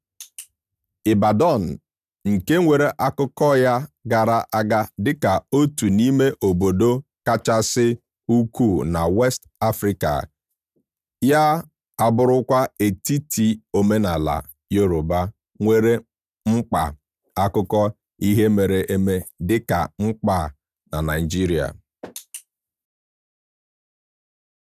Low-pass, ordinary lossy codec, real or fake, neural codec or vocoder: 14.4 kHz; none; real; none